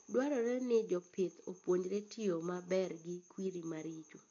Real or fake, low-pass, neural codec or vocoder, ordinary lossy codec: real; 7.2 kHz; none; MP3, 48 kbps